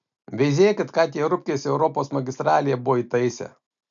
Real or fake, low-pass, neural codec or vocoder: real; 7.2 kHz; none